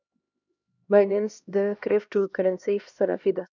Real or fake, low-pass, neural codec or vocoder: fake; 7.2 kHz; codec, 16 kHz, 1 kbps, X-Codec, HuBERT features, trained on LibriSpeech